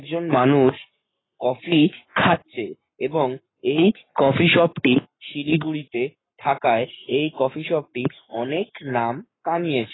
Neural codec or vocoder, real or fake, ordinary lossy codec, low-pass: codec, 44.1 kHz, 7.8 kbps, Pupu-Codec; fake; AAC, 16 kbps; 7.2 kHz